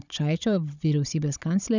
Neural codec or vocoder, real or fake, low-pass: codec, 16 kHz, 16 kbps, FunCodec, trained on Chinese and English, 50 frames a second; fake; 7.2 kHz